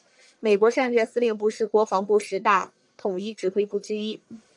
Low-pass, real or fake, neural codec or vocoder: 10.8 kHz; fake; codec, 44.1 kHz, 1.7 kbps, Pupu-Codec